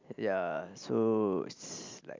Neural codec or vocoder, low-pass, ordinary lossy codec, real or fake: none; 7.2 kHz; MP3, 64 kbps; real